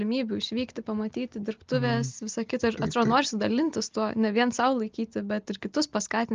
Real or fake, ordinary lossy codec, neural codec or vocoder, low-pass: real; Opus, 24 kbps; none; 7.2 kHz